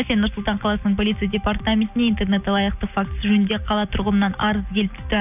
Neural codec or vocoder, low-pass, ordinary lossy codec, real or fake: none; 3.6 kHz; none; real